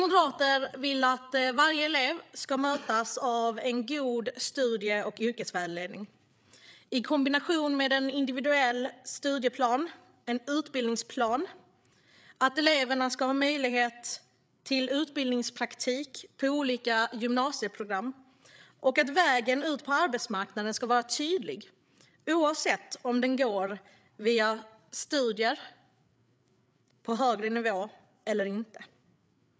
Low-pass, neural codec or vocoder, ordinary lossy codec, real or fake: none; codec, 16 kHz, 8 kbps, FreqCodec, larger model; none; fake